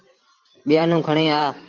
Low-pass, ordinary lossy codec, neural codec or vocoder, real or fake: 7.2 kHz; Opus, 32 kbps; vocoder, 44.1 kHz, 128 mel bands every 512 samples, BigVGAN v2; fake